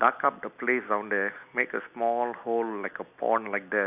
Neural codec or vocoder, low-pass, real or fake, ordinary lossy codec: none; 3.6 kHz; real; none